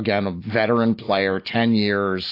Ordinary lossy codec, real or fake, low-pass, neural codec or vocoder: AAC, 32 kbps; real; 5.4 kHz; none